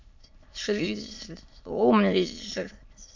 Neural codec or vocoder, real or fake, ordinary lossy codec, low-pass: autoencoder, 22.05 kHz, a latent of 192 numbers a frame, VITS, trained on many speakers; fake; MP3, 48 kbps; 7.2 kHz